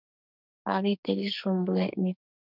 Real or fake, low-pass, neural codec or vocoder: fake; 5.4 kHz; codec, 44.1 kHz, 2.6 kbps, SNAC